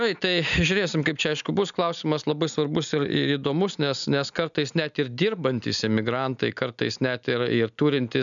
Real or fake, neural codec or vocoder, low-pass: real; none; 7.2 kHz